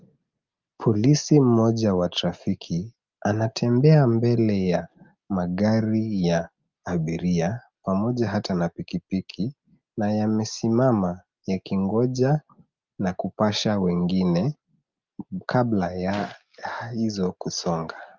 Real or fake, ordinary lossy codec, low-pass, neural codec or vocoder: real; Opus, 32 kbps; 7.2 kHz; none